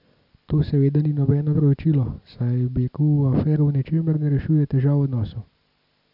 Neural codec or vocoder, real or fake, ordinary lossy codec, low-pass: none; real; none; 5.4 kHz